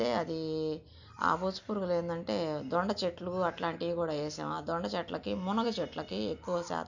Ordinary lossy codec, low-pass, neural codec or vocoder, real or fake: none; 7.2 kHz; none; real